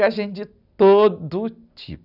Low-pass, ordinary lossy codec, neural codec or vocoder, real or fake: 5.4 kHz; none; none; real